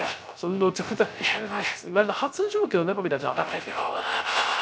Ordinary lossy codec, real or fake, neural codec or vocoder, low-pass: none; fake; codec, 16 kHz, 0.3 kbps, FocalCodec; none